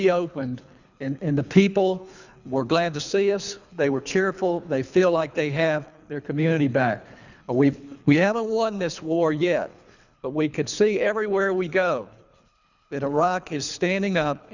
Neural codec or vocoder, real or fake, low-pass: codec, 24 kHz, 3 kbps, HILCodec; fake; 7.2 kHz